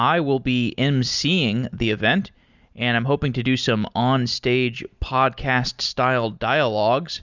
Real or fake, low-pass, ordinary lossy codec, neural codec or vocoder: real; 7.2 kHz; Opus, 64 kbps; none